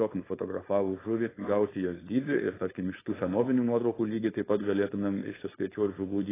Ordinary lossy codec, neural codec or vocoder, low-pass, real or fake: AAC, 16 kbps; codec, 16 kHz, 4.8 kbps, FACodec; 3.6 kHz; fake